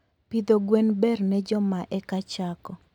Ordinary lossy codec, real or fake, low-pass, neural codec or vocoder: none; real; 19.8 kHz; none